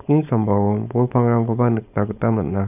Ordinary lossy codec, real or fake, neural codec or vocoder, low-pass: none; fake; codec, 16 kHz, 4.8 kbps, FACodec; 3.6 kHz